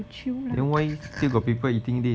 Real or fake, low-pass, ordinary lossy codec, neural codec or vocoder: real; none; none; none